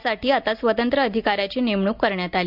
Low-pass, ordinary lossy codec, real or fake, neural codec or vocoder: 5.4 kHz; none; real; none